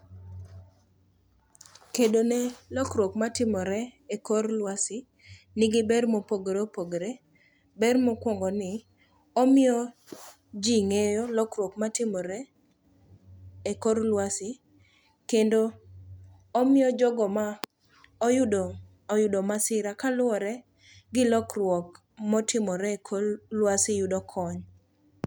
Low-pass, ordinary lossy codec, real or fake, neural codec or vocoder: none; none; real; none